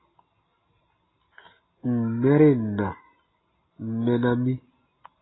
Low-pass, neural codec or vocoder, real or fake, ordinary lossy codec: 7.2 kHz; none; real; AAC, 16 kbps